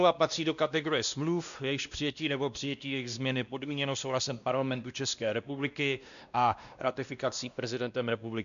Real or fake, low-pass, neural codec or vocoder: fake; 7.2 kHz; codec, 16 kHz, 1 kbps, X-Codec, WavLM features, trained on Multilingual LibriSpeech